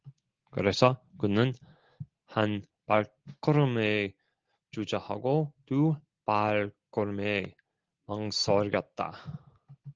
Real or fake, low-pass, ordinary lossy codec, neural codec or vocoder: real; 7.2 kHz; Opus, 16 kbps; none